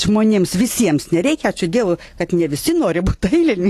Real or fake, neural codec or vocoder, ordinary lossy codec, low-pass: real; none; AAC, 48 kbps; 14.4 kHz